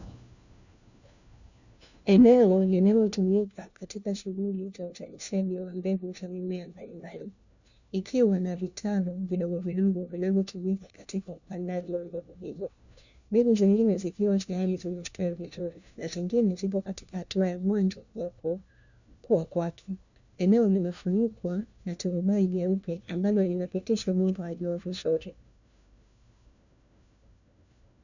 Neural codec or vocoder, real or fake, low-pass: codec, 16 kHz, 1 kbps, FunCodec, trained on LibriTTS, 50 frames a second; fake; 7.2 kHz